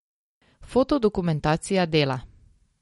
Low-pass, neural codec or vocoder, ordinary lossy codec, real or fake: 14.4 kHz; none; MP3, 48 kbps; real